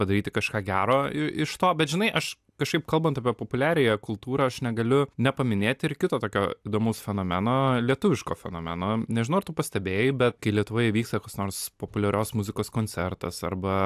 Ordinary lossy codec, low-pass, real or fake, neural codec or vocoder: AAC, 96 kbps; 14.4 kHz; real; none